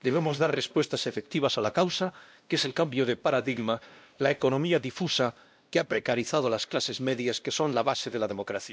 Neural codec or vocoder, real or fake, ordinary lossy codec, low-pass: codec, 16 kHz, 1 kbps, X-Codec, WavLM features, trained on Multilingual LibriSpeech; fake; none; none